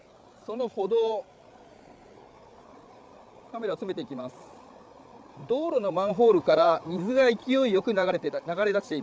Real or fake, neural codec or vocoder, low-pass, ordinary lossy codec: fake; codec, 16 kHz, 8 kbps, FreqCodec, larger model; none; none